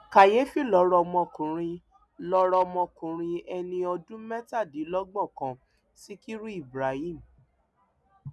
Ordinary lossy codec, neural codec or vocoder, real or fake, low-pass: none; none; real; none